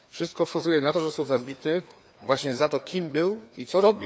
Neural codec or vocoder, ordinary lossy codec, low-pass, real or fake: codec, 16 kHz, 2 kbps, FreqCodec, larger model; none; none; fake